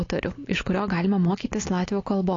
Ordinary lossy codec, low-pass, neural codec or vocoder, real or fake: AAC, 32 kbps; 7.2 kHz; none; real